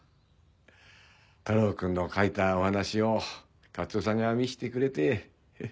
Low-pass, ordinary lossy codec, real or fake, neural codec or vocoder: none; none; real; none